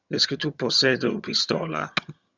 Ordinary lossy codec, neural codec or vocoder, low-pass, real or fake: Opus, 64 kbps; vocoder, 22.05 kHz, 80 mel bands, HiFi-GAN; 7.2 kHz; fake